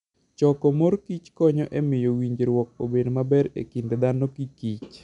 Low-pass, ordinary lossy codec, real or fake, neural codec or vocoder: 10.8 kHz; none; real; none